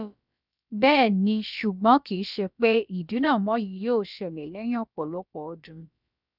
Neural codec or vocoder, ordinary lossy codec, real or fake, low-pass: codec, 16 kHz, about 1 kbps, DyCAST, with the encoder's durations; none; fake; 5.4 kHz